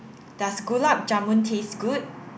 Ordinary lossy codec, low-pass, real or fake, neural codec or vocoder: none; none; real; none